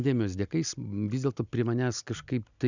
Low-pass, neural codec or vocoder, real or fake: 7.2 kHz; none; real